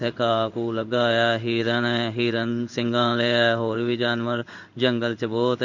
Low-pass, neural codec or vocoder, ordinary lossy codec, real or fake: 7.2 kHz; codec, 16 kHz in and 24 kHz out, 1 kbps, XY-Tokenizer; none; fake